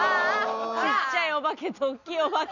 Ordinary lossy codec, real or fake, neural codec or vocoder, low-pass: none; real; none; 7.2 kHz